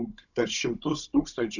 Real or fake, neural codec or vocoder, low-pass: fake; codec, 16 kHz, 16 kbps, FunCodec, trained on Chinese and English, 50 frames a second; 7.2 kHz